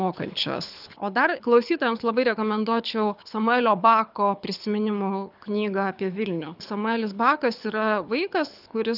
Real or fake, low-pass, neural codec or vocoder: fake; 5.4 kHz; codec, 24 kHz, 6 kbps, HILCodec